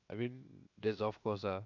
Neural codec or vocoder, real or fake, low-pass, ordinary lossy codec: codec, 16 kHz, 0.7 kbps, FocalCodec; fake; 7.2 kHz; none